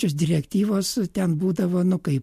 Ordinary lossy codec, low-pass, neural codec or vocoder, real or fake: MP3, 64 kbps; 14.4 kHz; none; real